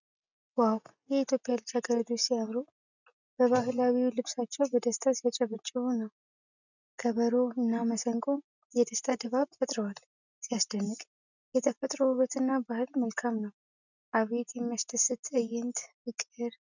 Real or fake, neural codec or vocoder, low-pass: real; none; 7.2 kHz